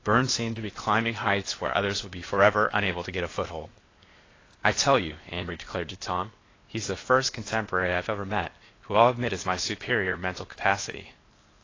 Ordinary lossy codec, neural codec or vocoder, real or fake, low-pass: AAC, 32 kbps; codec, 16 kHz, 0.8 kbps, ZipCodec; fake; 7.2 kHz